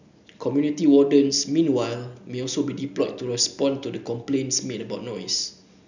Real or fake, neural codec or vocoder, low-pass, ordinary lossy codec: real; none; 7.2 kHz; none